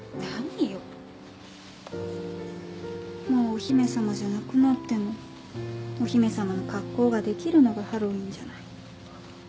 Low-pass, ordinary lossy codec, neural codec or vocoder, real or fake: none; none; none; real